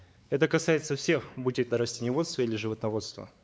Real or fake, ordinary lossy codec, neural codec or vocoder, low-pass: fake; none; codec, 16 kHz, 4 kbps, X-Codec, WavLM features, trained on Multilingual LibriSpeech; none